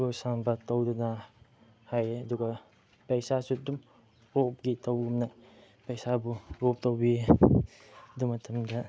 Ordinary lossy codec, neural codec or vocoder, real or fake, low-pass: none; none; real; none